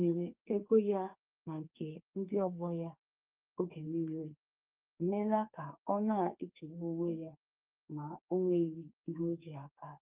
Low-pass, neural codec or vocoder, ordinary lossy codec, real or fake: 3.6 kHz; codec, 44.1 kHz, 2.6 kbps, SNAC; Opus, 24 kbps; fake